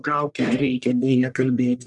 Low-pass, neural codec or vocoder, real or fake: 10.8 kHz; codec, 44.1 kHz, 1.7 kbps, Pupu-Codec; fake